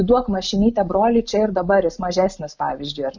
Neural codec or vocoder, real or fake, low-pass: none; real; 7.2 kHz